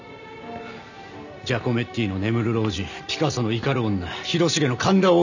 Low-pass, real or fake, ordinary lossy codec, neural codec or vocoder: 7.2 kHz; real; none; none